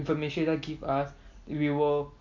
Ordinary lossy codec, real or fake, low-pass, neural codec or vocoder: MP3, 48 kbps; real; 7.2 kHz; none